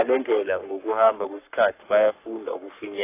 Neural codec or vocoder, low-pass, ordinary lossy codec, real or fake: codec, 44.1 kHz, 3.4 kbps, Pupu-Codec; 3.6 kHz; AAC, 24 kbps; fake